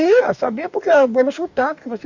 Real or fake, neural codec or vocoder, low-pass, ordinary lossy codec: fake; codec, 44.1 kHz, 2.6 kbps, DAC; 7.2 kHz; none